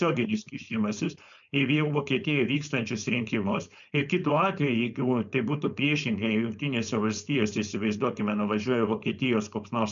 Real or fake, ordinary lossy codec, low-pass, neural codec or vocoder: fake; MP3, 96 kbps; 7.2 kHz; codec, 16 kHz, 4.8 kbps, FACodec